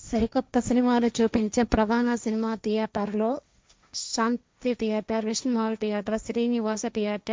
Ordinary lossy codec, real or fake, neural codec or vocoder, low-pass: none; fake; codec, 16 kHz, 1.1 kbps, Voila-Tokenizer; none